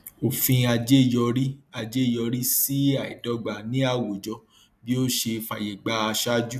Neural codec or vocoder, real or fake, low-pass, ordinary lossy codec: none; real; 14.4 kHz; none